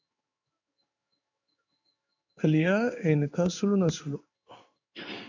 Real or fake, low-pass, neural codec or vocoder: fake; 7.2 kHz; codec, 16 kHz in and 24 kHz out, 1 kbps, XY-Tokenizer